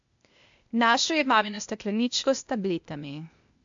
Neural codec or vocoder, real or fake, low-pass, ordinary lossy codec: codec, 16 kHz, 0.8 kbps, ZipCodec; fake; 7.2 kHz; AAC, 64 kbps